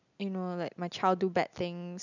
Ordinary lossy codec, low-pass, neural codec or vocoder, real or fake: none; 7.2 kHz; none; real